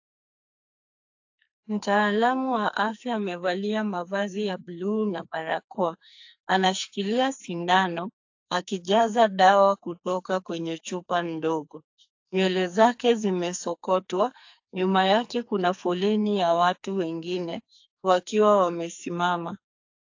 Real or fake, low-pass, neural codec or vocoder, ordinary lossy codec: fake; 7.2 kHz; codec, 44.1 kHz, 2.6 kbps, SNAC; AAC, 48 kbps